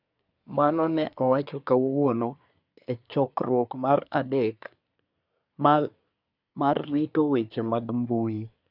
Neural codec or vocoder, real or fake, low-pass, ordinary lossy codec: codec, 24 kHz, 1 kbps, SNAC; fake; 5.4 kHz; none